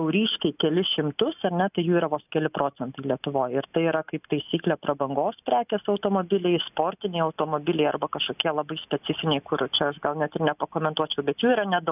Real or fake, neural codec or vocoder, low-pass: real; none; 3.6 kHz